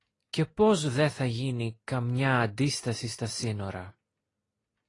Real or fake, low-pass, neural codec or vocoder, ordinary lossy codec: real; 10.8 kHz; none; AAC, 32 kbps